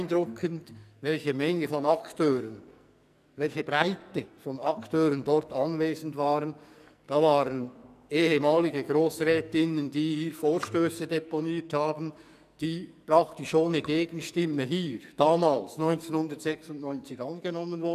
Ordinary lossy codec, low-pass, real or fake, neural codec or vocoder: none; 14.4 kHz; fake; codec, 44.1 kHz, 2.6 kbps, SNAC